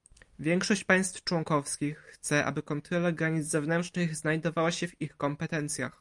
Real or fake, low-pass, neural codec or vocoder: real; 10.8 kHz; none